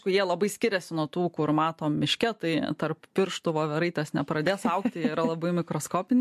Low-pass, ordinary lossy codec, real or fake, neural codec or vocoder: 14.4 kHz; MP3, 64 kbps; real; none